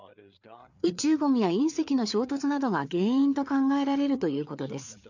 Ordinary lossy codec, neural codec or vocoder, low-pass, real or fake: none; codec, 16 kHz, 4 kbps, FreqCodec, larger model; 7.2 kHz; fake